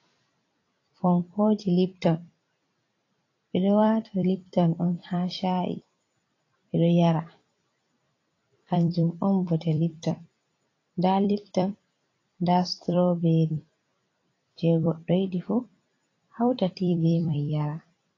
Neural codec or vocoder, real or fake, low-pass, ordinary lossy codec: vocoder, 44.1 kHz, 128 mel bands every 256 samples, BigVGAN v2; fake; 7.2 kHz; AAC, 32 kbps